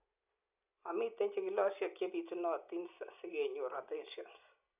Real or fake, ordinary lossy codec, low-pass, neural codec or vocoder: real; none; 3.6 kHz; none